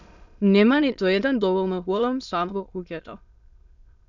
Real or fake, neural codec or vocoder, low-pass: fake; autoencoder, 22.05 kHz, a latent of 192 numbers a frame, VITS, trained on many speakers; 7.2 kHz